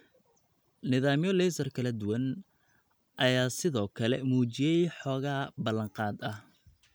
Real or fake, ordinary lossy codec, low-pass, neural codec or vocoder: real; none; none; none